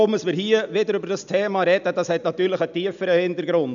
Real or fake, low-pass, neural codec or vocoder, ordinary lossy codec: real; 7.2 kHz; none; none